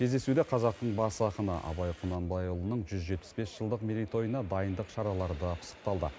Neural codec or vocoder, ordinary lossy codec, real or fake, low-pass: none; none; real; none